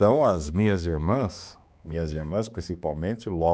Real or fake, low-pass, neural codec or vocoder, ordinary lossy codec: fake; none; codec, 16 kHz, 2 kbps, X-Codec, HuBERT features, trained on balanced general audio; none